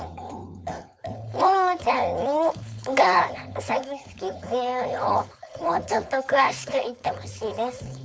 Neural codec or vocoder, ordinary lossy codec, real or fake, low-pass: codec, 16 kHz, 4.8 kbps, FACodec; none; fake; none